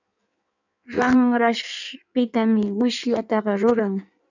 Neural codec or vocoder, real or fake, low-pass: codec, 16 kHz in and 24 kHz out, 1.1 kbps, FireRedTTS-2 codec; fake; 7.2 kHz